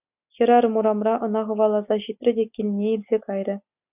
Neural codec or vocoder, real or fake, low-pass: none; real; 3.6 kHz